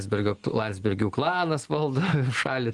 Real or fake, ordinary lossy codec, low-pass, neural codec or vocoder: real; Opus, 24 kbps; 10.8 kHz; none